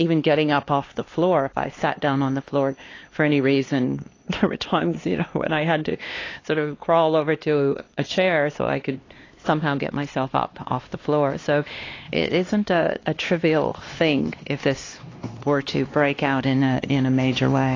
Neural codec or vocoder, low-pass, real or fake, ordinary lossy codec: codec, 16 kHz, 2 kbps, X-Codec, HuBERT features, trained on LibriSpeech; 7.2 kHz; fake; AAC, 32 kbps